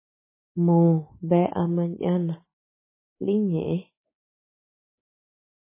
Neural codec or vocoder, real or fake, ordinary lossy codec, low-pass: codec, 16 kHz, 6 kbps, DAC; fake; MP3, 16 kbps; 3.6 kHz